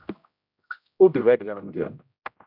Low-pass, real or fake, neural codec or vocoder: 5.4 kHz; fake; codec, 16 kHz, 0.5 kbps, X-Codec, HuBERT features, trained on general audio